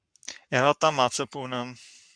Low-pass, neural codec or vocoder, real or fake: 9.9 kHz; codec, 44.1 kHz, 7.8 kbps, Pupu-Codec; fake